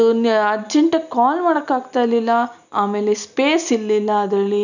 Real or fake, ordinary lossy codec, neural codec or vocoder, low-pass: real; none; none; 7.2 kHz